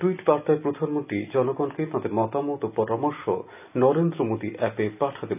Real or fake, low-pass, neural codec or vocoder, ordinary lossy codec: real; 3.6 kHz; none; none